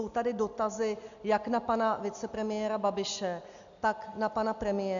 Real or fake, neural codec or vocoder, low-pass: real; none; 7.2 kHz